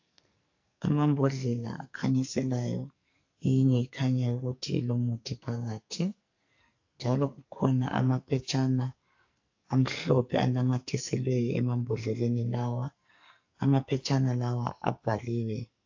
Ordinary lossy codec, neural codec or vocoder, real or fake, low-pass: AAC, 48 kbps; codec, 44.1 kHz, 2.6 kbps, SNAC; fake; 7.2 kHz